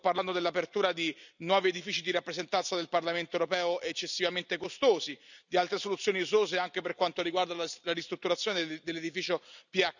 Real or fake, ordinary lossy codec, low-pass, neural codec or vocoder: real; none; 7.2 kHz; none